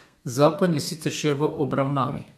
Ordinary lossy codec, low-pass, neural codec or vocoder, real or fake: none; 14.4 kHz; codec, 32 kHz, 1.9 kbps, SNAC; fake